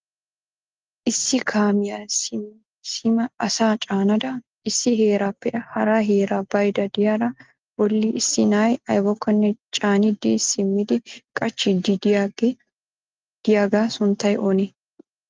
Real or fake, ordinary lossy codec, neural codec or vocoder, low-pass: real; Opus, 32 kbps; none; 7.2 kHz